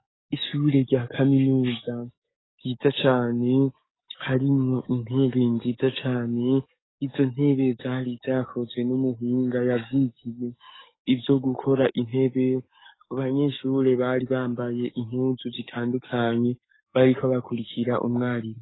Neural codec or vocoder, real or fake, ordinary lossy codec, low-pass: codec, 44.1 kHz, 7.8 kbps, DAC; fake; AAC, 16 kbps; 7.2 kHz